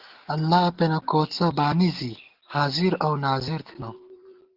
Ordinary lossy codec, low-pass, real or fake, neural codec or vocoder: Opus, 16 kbps; 5.4 kHz; real; none